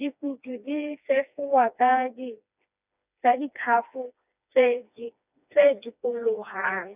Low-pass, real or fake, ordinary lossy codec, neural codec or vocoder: 3.6 kHz; fake; none; codec, 16 kHz, 2 kbps, FreqCodec, smaller model